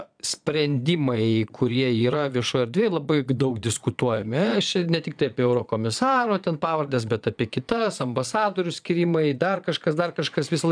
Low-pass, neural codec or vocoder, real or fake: 9.9 kHz; vocoder, 22.05 kHz, 80 mel bands, Vocos; fake